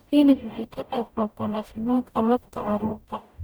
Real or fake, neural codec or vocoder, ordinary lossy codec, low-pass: fake; codec, 44.1 kHz, 0.9 kbps, DAC; none; none